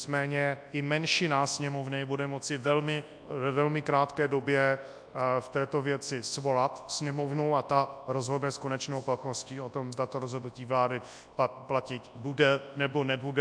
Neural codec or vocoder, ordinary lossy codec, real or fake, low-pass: codec, 24 kHz, 0.9 kbps, WavTokenizer, large speech release; AAC, 64 kbps; fake; 9.9 kHz